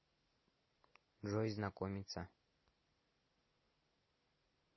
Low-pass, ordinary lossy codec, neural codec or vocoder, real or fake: 7.2 kHz; MP3, 24 kbps; none; real